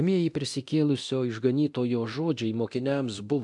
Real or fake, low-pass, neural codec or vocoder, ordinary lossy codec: fake; 10.8 kHz; codec, 24 kHz, 0.9 kbps, DualCodec; AAC, 64 kbps